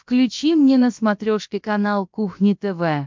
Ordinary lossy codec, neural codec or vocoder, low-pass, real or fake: MP3, 64 kbps; codec, 16 kHz, about 1 kbps, DyCAST, with the encoder's durations; 7.2 kHz; fake